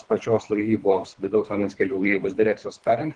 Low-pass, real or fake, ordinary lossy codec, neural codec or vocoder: 9.9 kHz; fake; Opus, 24 kbps; codec, 24 kHz, 3 kbps, HILCodec